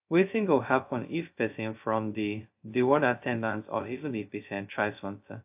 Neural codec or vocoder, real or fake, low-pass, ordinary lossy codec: codec, 16 kHz, 0.2 kbps, FocalCodec; fake; 3.6 kHz; AAC, 32 kbps